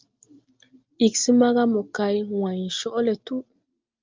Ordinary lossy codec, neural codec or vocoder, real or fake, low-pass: Opus, 24 kbps; none; real; 7.2 kHz